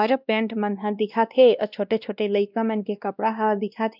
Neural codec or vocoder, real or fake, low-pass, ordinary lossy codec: codec, 16 kHz, 1 kbps, X-Codec, WavLM features, trained on Multilingual LibriSpeech; fake; 5.4 kHz; none